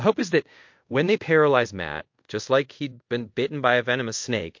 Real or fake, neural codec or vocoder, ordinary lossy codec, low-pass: fake; codec, 24 kHz, 0.5 kbps, DualCodec; MP3, 48 kbps; 7.2 kHz